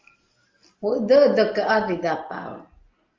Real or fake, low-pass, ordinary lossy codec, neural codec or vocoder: real; 7.2 kHz; Opus, 32 kbps; none